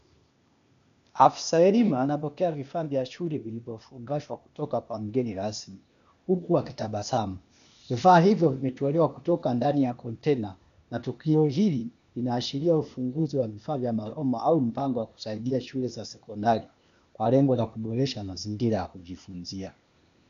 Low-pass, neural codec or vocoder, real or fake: 7.2 kHz; codec, 16 kHz, 0.8 kbps, ZipCodec; fake